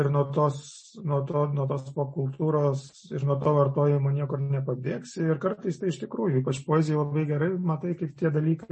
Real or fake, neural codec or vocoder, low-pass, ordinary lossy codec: real; none; 10.8 kHz; MP3, 32 kbps